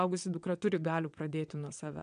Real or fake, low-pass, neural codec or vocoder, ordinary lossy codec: fake; 9.9 kHz; vocoder, 22.05 kHz, 80 mel bands, WaveNeXt; MP3, 96 kbps